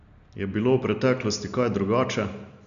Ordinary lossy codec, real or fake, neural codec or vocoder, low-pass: AAC, 96 kbps; real; none; 7.2 kHz